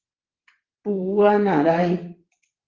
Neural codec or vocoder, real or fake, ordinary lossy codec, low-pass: vocoder, 22.05 kHz, 80 mel bands, WaveNeXt; fake; Opus, 16 kbps; 7.2 kHz